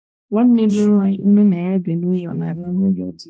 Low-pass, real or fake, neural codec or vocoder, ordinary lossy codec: none; fake; codec, 16 kHz, 1 kbps, X-Codec, HuBERT features, trained on balanced general audio; none